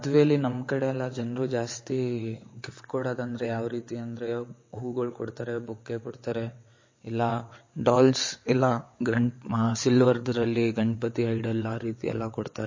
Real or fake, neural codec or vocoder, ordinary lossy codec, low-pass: fake; vocoder, 22.05 kHz, 80 mel bands, WaveNeXt; MP3, 32 kbps; 7.2 kHz